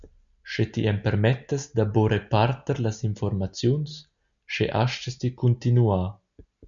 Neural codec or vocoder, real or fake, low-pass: none; real; 7.2 kHz